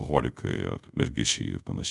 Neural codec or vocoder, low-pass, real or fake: codec, 24 kHz, 0.5 kbps, DualCodec; 10.8 kHz; fake